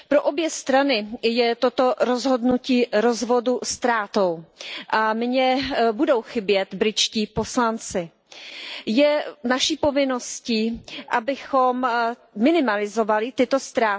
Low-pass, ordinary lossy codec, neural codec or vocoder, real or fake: none; none; none; real